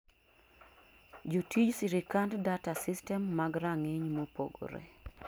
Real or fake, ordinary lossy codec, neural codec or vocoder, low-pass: real; none; none; none